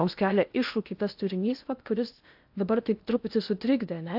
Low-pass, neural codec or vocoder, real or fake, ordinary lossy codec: 5.4 kHz; codec, 16 kHz in and 24 kHz out, 0.8 kbps, FocalCodec, streaming, 65536 codes; fake; AAC, 48 kbps